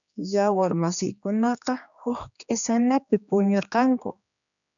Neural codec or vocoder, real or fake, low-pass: codec, 16 kHz, 2 kbps, X-Codec, HuBERT features, trained on general audio; fake; 7.2 kHz